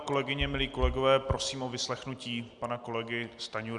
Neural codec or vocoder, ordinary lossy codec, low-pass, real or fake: none; Opus, 64 kbps; 10.8 kHz; real